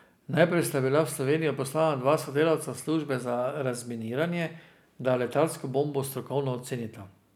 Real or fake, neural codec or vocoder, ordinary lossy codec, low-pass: real; none; none; none